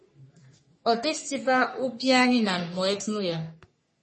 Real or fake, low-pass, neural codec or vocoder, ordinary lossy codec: fake; 10.8 kHz; codec, 44.1 kHz, 3.4 kbps, Pupu-Codec; MP3, 32 kbps